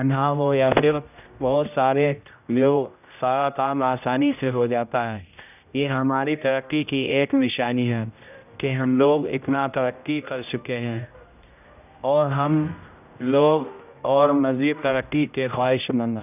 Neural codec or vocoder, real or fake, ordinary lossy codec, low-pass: codec, 16 kHz, 0.5 kbps, X-Codec, HuBERT features, trained on general audio; fake; none; 3.6 kHz